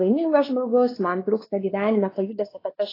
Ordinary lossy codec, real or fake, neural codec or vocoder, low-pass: AAC, 24 kbps; fake; codec, 16 kHz, 2 kbps, X-Codec, WavLM features, trained on Multilingual LibriSpeech; 5.4 kHz